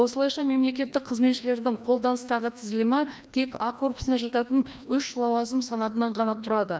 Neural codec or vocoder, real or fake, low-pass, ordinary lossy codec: codec, 16 kHz, 1 kbps, FreqCodec, larger model; fake; none; none